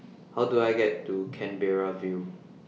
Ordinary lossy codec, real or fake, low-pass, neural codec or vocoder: none; real; none; none